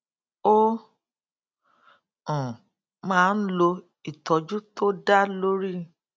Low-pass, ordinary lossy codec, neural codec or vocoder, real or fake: none; none; none; real